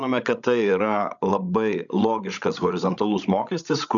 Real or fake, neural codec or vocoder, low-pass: fake; codec, 16 kHz, 16 kbps, FreqCodec, larger model; 7.2 kHz